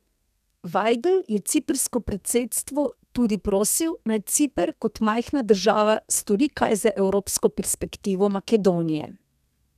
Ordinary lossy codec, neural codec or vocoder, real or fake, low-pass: none; codec, 32 kHz, 1.9 kbps, SNAC; fake; 14.4 kHz